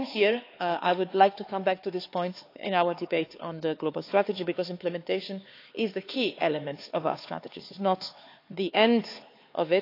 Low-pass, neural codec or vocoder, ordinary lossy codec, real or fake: 5.4 kHz; codec, 16 kHz, 4 kbps, X-Codec, HuBERT features, trained on LibriSpeech; AAC, 32 kbps; fake